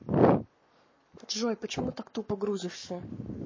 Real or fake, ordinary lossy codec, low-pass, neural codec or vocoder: fake; MP3, 32 kbps; 7.2 kHz; codec, 44.1 kHz, 7.8 kbps, Pupu-Codec